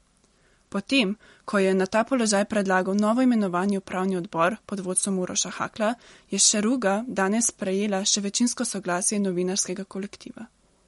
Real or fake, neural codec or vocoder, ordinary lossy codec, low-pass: real; none; MP3, 48 kbps; 19.8 kHz